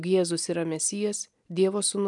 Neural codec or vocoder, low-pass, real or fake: none; 10.8 kHz; real